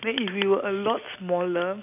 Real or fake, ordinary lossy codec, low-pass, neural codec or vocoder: real; none; 3.6 kHz; none